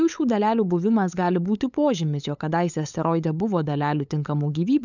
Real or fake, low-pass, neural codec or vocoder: fake; 7.2 kHz; codec, 16 kHz, 8 kbps, FunCodec, trained on LibriTTS, 25 frames a second